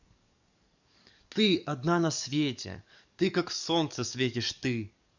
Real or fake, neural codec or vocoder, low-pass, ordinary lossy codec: fake; codec, 44.1 kHz, 7.8 kbps, DAC; 7.2 kHz; none